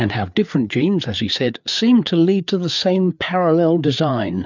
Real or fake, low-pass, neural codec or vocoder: fake; 7.2 kHz; codec, 16 kHz, 4 kbps, FreqCodec, larger model